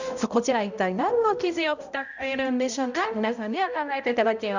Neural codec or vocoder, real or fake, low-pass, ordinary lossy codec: codec, 16 kHz, 0.5 kbps, X-Codec, HuBERT features, trained on balanced general audio; fake; 7.2 kHz; none